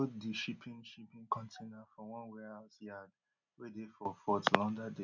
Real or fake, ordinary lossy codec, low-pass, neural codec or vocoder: real; none; 7.2 kHz; none